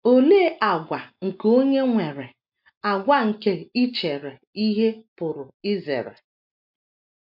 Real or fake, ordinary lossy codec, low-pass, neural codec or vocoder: real; MP3, 48 kbps; 5.4 kHz; none